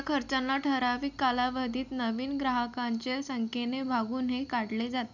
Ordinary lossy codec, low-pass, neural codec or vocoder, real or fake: none; 7.2 kHz; none; real